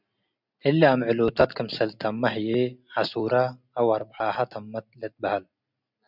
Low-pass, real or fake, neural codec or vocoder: 5.4 kHz; real; none